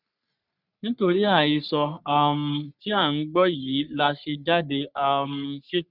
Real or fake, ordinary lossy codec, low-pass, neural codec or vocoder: fake; none; 5.4 kHz; codec, 44.1 kHz, 3.4 kbps, Pupu-Codec